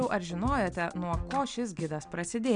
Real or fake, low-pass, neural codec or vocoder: real; 9.9 kHz; none